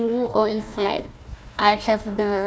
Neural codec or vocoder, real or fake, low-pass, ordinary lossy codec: codec, 16 kHz, 1 kbps, FunCodec, trained on Chinese and English, 50 frames a second; fake; none; none